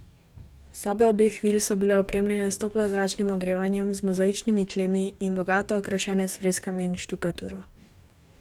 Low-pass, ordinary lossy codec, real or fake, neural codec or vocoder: 19.8 kHz; none; fake; codec, 44.1 kHz, 2.6 kbps, DAC